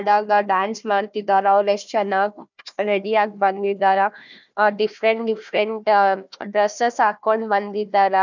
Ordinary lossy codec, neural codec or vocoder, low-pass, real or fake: none; codec, 16 kHz, 1 kbps, FunCodec, trained on Chinese and English, 50 frames a second; 7.2 kHz; fake